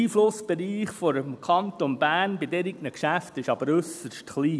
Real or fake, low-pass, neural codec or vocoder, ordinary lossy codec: real; none; none; none